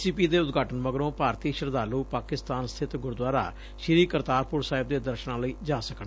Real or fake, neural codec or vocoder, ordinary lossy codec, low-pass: real; none; none; none